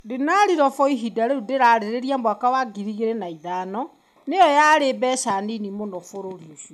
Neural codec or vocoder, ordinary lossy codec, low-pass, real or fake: none; none; 14.4 kHz; real